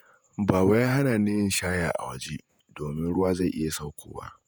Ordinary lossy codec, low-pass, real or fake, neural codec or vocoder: none; none; fake; vocoder, 48 kHz, 128 mel bands, Vocos